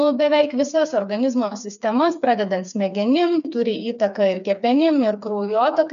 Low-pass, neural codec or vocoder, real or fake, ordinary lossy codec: 7.2 kHz; codec, 16 kHz, 4 kbps, FreqCodec, smaller model; fake; MP3, 96 kbps